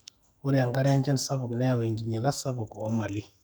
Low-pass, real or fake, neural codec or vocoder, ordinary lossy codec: none; fake; codec, 44.1 kHz, 2.6 kbps, SNAC; none